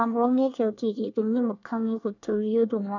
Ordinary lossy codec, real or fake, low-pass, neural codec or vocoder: none; fake; 7.2 kHz; codec, 24 kHz, 1 kbps, SNAC